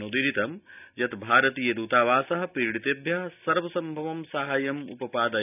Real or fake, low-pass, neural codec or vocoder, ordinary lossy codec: real; 3.6 kHz; none; none